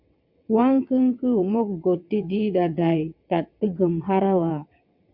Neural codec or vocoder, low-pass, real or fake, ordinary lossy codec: vocoder, 22.05 kHz, 80 mel bands, WaveNeXt; 5.4 kHz; fake; MP3, 48 kbps